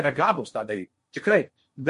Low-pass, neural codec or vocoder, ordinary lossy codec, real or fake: 10.8 kHz; codec, 16 kHz in and 24 kHz out, 0.8 kbps, FocalCodec, streaming, 65536 codes; MP3, 48 kbps; fake